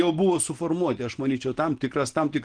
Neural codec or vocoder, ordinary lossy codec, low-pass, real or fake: none; Opus, 16 kbps; 9.9 kHz; real